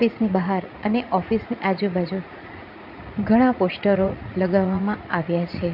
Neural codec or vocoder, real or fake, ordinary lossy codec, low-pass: vocoder, 44.1 kHz, 80 mel bands, Vocos; fake; none; 5.4 kHz